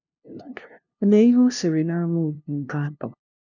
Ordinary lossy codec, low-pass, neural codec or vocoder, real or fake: none; 7.2 kHz; codec, 16 kHz, 0.5 kbps, FunCodec, trained on LibriTTS, 25 frames a second; fake